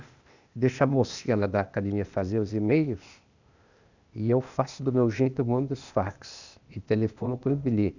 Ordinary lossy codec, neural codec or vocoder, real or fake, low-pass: none; codec, 16 kHz, 0.8 kbps, ZipCodec; fake; 7.2 kHz